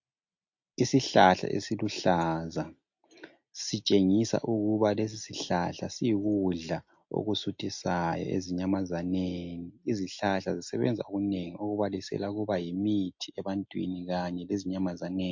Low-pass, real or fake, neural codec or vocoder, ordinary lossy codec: 7.2 kHz; real; none; MP3, 48 kbps